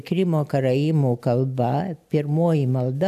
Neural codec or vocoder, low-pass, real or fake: none; 14.4 kHz; real